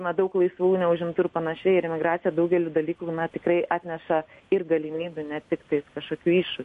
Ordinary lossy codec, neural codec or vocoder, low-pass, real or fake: MP3, 48 kbps; none; 14.4 kHz; real